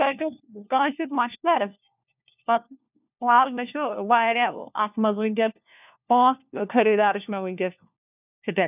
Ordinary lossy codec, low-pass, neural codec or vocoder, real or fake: none; 3.6 kHz; codec, 16 kHz, 1 kbps, FunCodec, trained on LibriTTS, 50 frames a second; fake